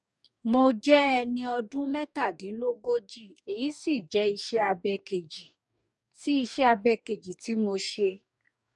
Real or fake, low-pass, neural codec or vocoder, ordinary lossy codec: fake; 10.8 kHz; codec, 44.1 kHz, 2.6 kbps, DAC; none